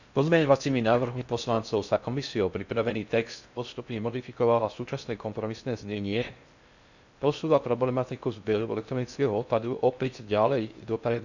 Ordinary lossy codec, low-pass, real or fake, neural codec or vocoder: none; 7.2 kHz; fake; codec, 16 kHz in and 24 kHz out, 0.6 kbps, FocalCodec, streaming, 4096 codes